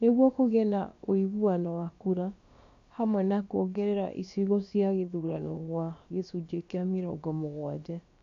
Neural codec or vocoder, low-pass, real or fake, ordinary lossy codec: codec, 16 kHz, about 1 kbps, DyCAST, with the encoder's durations; 7.2 kHz; fake; AAC, 48 kbps